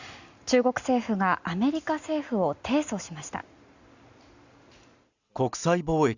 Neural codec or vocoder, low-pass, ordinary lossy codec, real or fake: none; 7.2 kHz; Opus, 64 kbps; real